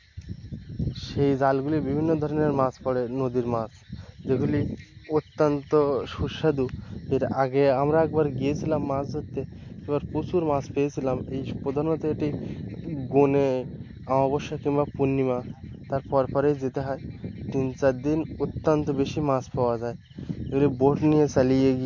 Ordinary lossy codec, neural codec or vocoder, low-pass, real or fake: MP3, 48 kbps; none; 7.2 kHz; real